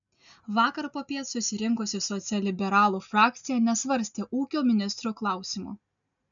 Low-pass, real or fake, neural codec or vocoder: 7.2 kHz; real; none